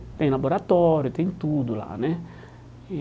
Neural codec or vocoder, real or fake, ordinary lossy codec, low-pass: none; real; none; none